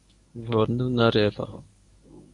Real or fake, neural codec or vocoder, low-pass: fake; codec, 24 kHz, 0.9 kbps, WavTokenizer, medium speech release version 1; 10.8 kHz